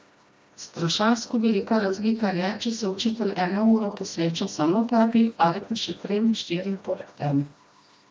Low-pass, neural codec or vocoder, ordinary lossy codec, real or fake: none; codec, 16 kHz, 1 kbps, FreqCodec, smaller model; none; fake